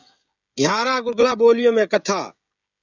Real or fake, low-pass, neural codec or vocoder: fake; 7.2 kHz; codec, 16 kHz, 16 kbps, FreqCodec, smaller model